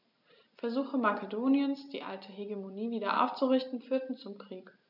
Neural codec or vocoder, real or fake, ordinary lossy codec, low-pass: none; real; none; 5.4 kHz